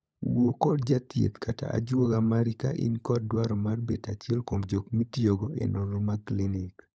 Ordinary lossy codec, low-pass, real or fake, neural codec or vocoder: none; none; fake; codec, 16 kHz, 16 kbps, FunCodec, trained on LibriTTS, 50 frames a second